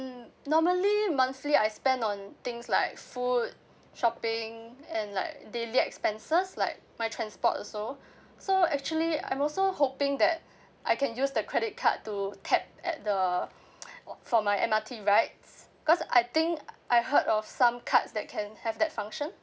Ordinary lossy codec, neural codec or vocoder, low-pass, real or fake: none; none; none; real